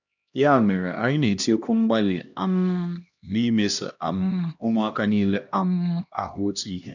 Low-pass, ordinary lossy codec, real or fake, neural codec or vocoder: 7.2 kHz; none; fake; codec, 16 kHz, 1 kbps, X-Codec, HuBERT features, trained on LibriSpeech